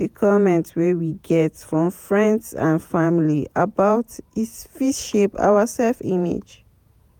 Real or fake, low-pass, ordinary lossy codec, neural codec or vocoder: fake; 19.8 kHz; none; vocoder, 48 kHz, 128 mel bands, Vocos